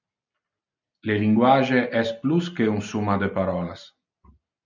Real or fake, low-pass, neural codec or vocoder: real; 7.2 kHz; none